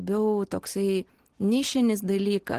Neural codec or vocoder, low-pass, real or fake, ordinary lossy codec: none; 14.4 kHz; real; Opus, 16 kbps